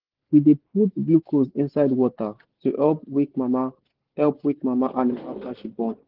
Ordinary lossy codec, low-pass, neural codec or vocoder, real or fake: Opus, 16 kbps; 5.4 kHz; none; real